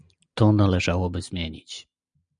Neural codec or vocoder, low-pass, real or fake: none; 9.9 kHz; real